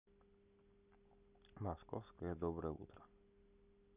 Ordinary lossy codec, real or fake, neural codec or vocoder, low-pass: none; real; none; 3.6 kHz